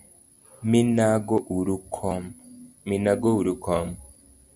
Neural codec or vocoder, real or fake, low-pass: none; real; 10.8 kHz